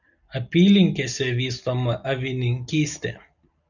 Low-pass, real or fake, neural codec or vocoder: 7.2 kHz; real; none